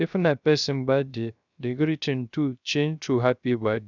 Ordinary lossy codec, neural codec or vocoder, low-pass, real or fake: none; codec, 16 kHz, 0.3 kbps, FocalCodec; 7.2 kHz; fake